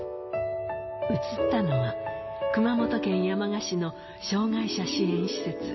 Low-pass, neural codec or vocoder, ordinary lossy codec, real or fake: 7.2 kHz; none; MP3, 24 kbps; real